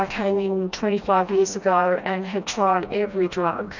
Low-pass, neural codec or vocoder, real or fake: 7.2 kHz; codec, 16 kHz, 1 kbps, FreqCodec, smaller model; fake